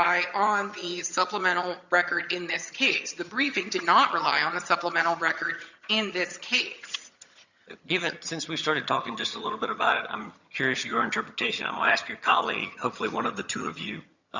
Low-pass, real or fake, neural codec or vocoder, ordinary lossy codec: 7.2 kHz; fake; vocoder, 22.05 kHz, 80 mel bands, HiFi-GAN; Opus, 64 kbps